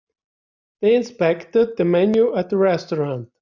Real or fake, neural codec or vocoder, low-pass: real; none; 7.2 kHz